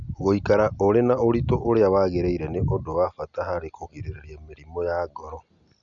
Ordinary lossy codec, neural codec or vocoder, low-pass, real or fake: none; none; 7.2 kHz; real